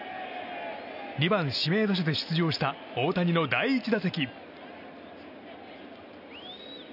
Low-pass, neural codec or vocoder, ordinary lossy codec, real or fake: 5.4 kHz; none; none; real